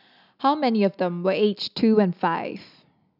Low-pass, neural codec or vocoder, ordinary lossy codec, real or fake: 5.4 kHz; none; none; real